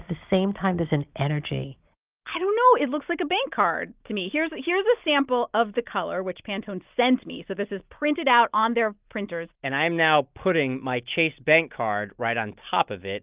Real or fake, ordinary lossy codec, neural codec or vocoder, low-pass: real; Opus, 32 kbps; none; 3.6 kHz